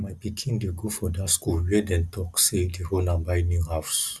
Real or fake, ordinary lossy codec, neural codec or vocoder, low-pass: real; none; none; none